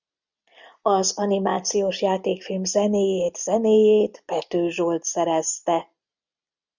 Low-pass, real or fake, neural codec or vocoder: 7.2 kHz; real; none